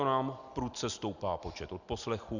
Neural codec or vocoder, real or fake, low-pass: none; real; 7.2 kHz